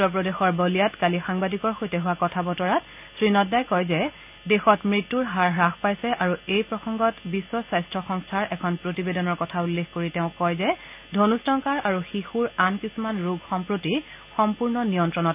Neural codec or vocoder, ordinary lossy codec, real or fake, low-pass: none; none; real; 3.6 kHz